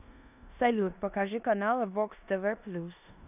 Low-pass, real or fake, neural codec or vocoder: 3.6 kHz; fake; codec, 16 kHz in and 24 kHz out, 0.9 kbps, LongCat-Audio-Codec, four codebook decoder